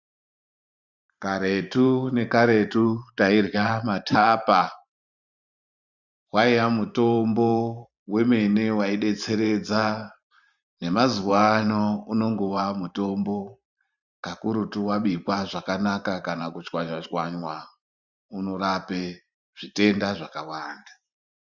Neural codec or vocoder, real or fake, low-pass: vocoder, 44.1 kHz, 128 mel bands every 512 samples, BigVGAN v2; fake; 7.2 kHz